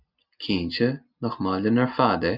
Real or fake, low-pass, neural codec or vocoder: real; 5.4 kHz; none